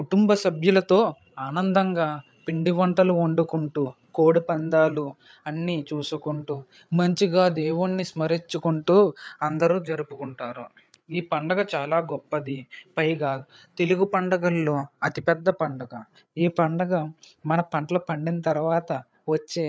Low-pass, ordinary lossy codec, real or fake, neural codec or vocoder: none; none; fake; codec, 16 kHz, 8 kbps, FreqCodec, larger model